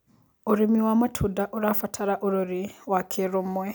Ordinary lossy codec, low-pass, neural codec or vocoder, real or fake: none; none; none; real